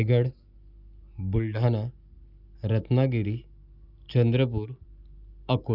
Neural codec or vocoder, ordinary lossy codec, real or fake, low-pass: none; none; real; 5.4 kHz